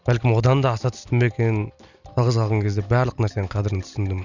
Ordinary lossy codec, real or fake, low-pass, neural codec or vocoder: none; real; 7.2 kHz; none